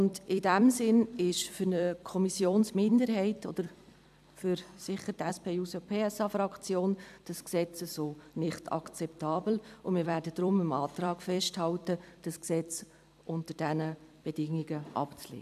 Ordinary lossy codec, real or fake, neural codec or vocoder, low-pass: AAC, 96 kbps; fake; vocoder, 44.1 kHz, 128 mel bands every 256 samples, BigVGAN v2; 14.4 kHz